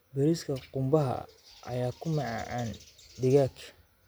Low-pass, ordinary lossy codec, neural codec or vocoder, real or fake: none; none; none; real